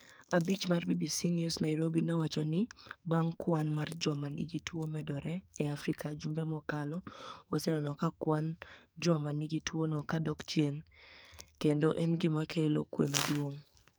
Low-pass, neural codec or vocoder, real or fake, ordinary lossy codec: none; codec, 44.1 kHz, 2.6 kbps, SNAC; fake; none